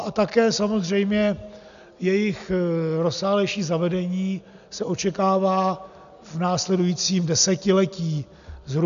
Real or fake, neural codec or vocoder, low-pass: real; none; 7.2 kHz